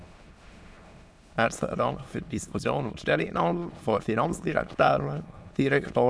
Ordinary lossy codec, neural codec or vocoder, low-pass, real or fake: none; autoencoder, 22.05 kHz, a latent of 192 numbers a frame, VITS, trained on many speakers; none; fake